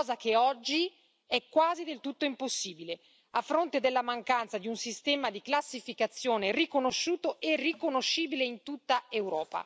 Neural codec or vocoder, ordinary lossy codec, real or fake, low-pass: none; none; real; none